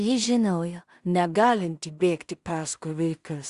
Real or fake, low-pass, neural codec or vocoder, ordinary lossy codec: fake; 10.8 kHz; codec, 16 kHz in and 24 kHz out, 0.4 kbps, LongCat-Audio-Codec, two codebook decoder; Opus, 64 kbps